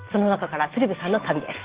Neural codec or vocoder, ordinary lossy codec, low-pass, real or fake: none; Opus, 16 kbps; 3.6 kHz; real